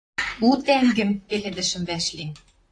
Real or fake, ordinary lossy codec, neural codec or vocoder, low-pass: fake; AAC, 48 kbps; vocoder, 44.1 kHz, 128 mel bands, Pupu-Vocoder; 9.9 kHz